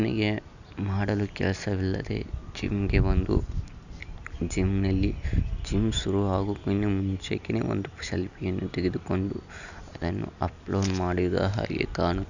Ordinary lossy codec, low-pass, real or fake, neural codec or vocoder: none; 7.2 kHz; real; none